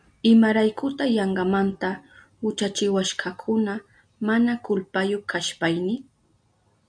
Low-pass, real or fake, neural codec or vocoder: 9.9 kHz; real; none